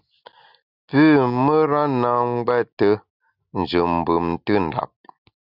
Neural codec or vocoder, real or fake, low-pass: none; real; 5.4 kHz